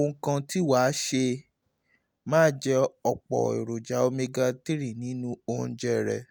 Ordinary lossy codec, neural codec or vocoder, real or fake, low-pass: none; none; real; none